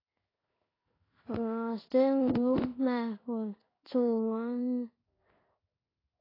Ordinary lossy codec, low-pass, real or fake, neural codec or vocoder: AAC, 24 kbps; 5.4 kHz; fake; codec, 16 kHz in and 24 kHz out, 1 kbps, XY-Tokenizer